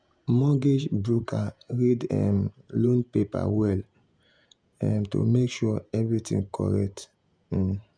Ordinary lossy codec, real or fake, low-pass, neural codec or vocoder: none; fake; 9.9 kHz; vocoder, 48 kHz, 128 mel bands, Vocos